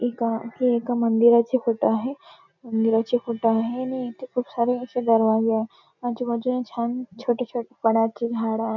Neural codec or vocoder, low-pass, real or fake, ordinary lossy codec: none; 7.2 kHz; real; none